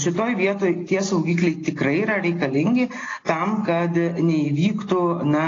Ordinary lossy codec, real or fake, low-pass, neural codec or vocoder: AAC, 32 kbps; real; 7.2 kHz; none